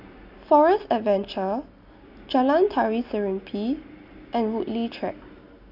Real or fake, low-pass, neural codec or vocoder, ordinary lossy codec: real; 5.4 kHz; none; none